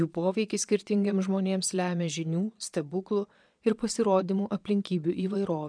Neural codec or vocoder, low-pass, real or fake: vocoder, 22.05 kHz, 80 mel bands, Vocos; 9.9 kHz; fake